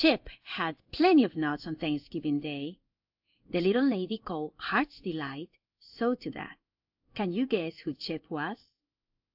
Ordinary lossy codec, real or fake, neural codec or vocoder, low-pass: AAC, 48 kbps; fake; codec, 16 kHz in and 24 kHz out, 1 kbps, XY-Tokenizer; 5.4 kHz